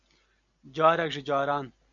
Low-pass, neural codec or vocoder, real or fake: 7.2 kHz; none; real